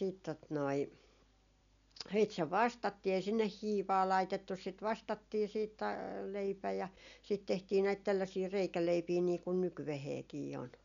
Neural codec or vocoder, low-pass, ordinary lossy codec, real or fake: none; 7.2 kHz; none; real